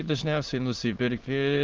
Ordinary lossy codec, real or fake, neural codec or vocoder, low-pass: Opus, 16 kbps; fake; autoencoder, 22.05 kHz, a latent of 192 numbers a frame, VITS, trained on many speakers; 7.2 kHz